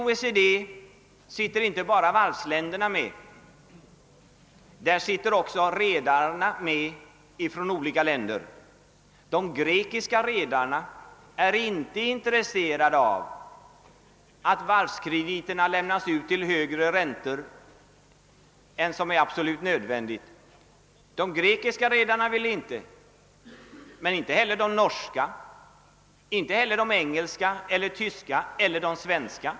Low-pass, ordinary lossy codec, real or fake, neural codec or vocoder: none; none; real; none